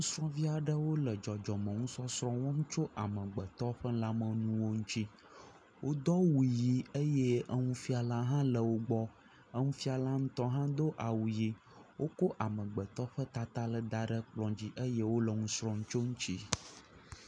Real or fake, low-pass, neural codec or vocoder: real; 9.9 kHz; none